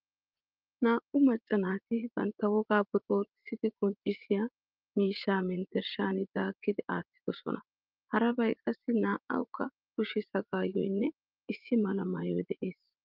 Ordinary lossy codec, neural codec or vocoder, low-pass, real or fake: Opus, 24 kbps; vocoder, 44.1 kHz, 80 mel bands, Vocos; 5.4 kHz; fake